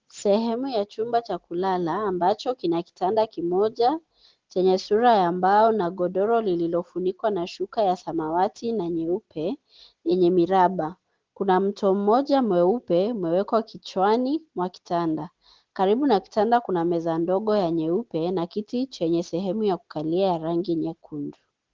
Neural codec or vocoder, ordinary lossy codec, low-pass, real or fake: none; Opus, 16 kbps; 7.2 kHz; real